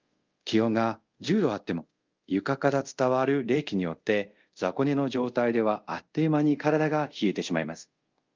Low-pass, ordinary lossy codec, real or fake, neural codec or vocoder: 7.2 kHz; Opus, 24 kbps; fake; codec, 24 kHz, 0.5 kbps, DualCodec